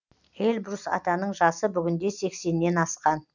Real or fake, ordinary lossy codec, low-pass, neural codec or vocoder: real; none; 7.2 kHz; none